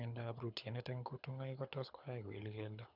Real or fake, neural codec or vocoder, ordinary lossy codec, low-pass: fake; codec, 24 kHz, 6 kbps, HILCodec; none; 5.4 kHz